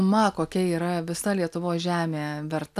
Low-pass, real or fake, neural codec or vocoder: 14.4 kHz; real; none